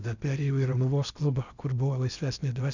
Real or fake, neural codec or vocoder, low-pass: fake; codec, 16 kHz in and 24 kHz out, 0.8 kbps, FocalCodec, streaming, 65536 codes; 7.2 kHz